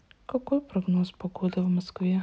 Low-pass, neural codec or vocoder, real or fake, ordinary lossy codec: none; none; real; none